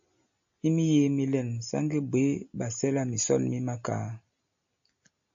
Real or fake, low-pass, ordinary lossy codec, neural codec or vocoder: real; 7.2 kHz; AAC, 64 kbps; none